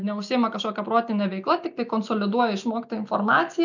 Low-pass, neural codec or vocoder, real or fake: 7.2 kHz; none; real